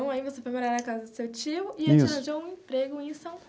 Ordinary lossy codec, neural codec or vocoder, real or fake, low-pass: none; none; real; none